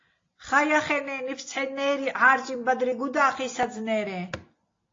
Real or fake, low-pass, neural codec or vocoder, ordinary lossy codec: real; 7.2 kHz; none; AAC, 32 kbps